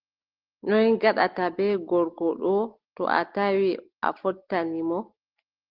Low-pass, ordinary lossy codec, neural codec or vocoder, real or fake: 5.4 kHz; Opus, 16 kbps; none; real